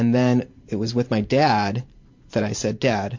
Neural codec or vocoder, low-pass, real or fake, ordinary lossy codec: vocoder, 44.1 kHz, 128 mel bands every 512 samples, BigVGAN v2; 7.2 kHz; fake; MP3, 48 kbps